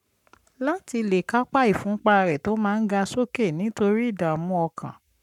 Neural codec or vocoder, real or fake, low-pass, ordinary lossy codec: codec, 44.1 kHz, 7.8 kbps, Pupu-Codec; fake; 19.8 kHz; none